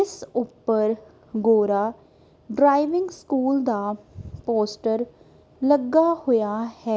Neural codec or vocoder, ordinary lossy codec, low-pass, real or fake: none; none; none; real